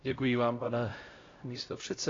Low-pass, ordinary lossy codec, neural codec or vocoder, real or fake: 7.2 kHz; AAC, 32 kbps; codec, 16 kHz, 0.5 kbps, X-Codec, HuBERT features, trained on LibriSpeech; fake